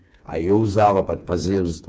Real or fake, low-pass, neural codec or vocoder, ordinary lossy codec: fake; none; codec, 16 kHz, 4 kbps, FreqCodec, smaller model; none